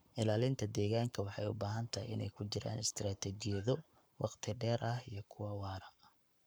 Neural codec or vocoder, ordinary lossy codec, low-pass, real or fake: codec, 44.1 kHz, 7.8 kbps, Pupu-Codec; none; none; fake